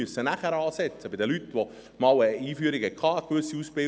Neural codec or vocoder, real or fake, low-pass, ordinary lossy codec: none; real; none; none